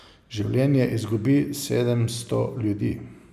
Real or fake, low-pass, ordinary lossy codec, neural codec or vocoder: real; 14.4 kHz; none; none